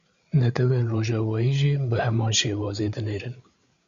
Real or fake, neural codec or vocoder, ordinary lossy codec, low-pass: fake; codec, 16 kHz, 8 kbps, FreqCodec, larger model; Opus, 64 kbps; 7.2 kHz